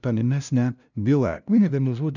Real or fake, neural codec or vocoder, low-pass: fake; codec, 16 kHz, 0.5 kbps, FunCodec, trained on LibriTTS, 25 frames a second; 7.2 kHz